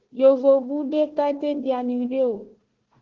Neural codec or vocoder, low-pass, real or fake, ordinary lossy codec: codec, 16 kHz, 1 kbps, FunCodec, trained on Chinese and English, 50 frames a second; 7.2 kHz; fake; Opus, 16 kbps